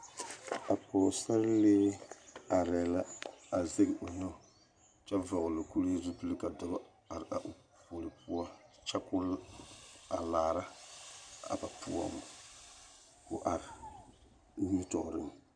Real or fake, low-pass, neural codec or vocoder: real; 9.9 kHz; none